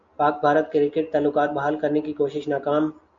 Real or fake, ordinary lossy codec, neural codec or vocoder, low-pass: real; MP3, 48 kbps; none; 7.2 kHz